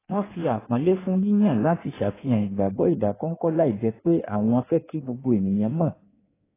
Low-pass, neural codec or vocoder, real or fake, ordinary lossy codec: 3.6 kHz; codec, 16 kHz in and 24 kHz out, 1.1 kbps, FireRedTTS-2 codec; fake; AAC, 16 kbps